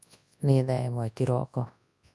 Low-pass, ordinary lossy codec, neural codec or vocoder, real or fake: none; none; codec, 24 kHz, 0.9 kbps, WavTokenizer, large speech release; fake